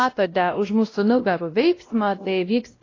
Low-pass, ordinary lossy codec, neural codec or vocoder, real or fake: 7.2 kHz; AAC, 32 kbps; codec, 16 kHz, 1 kbps, X-Codec, WavLM features, trained on Multilingual LibriSpeech; fake